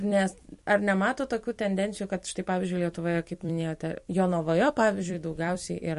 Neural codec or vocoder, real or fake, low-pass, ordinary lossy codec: vocoder, 44.1 kHz, 128 mel bands every 256 samples, BigVGAN v2; fake; 14.4 kHz; MP3, 48 kbps